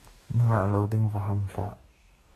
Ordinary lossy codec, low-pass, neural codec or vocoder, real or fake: AAC, 48 kbps; 14.4 kHz; codec, 44.1 kHz, 2.6 kbps, DAC; fake